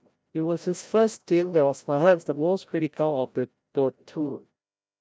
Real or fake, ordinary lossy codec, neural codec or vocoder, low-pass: fake; none; codec, 16 kHz, 0.5 kbps, FreqCodec, larger model; none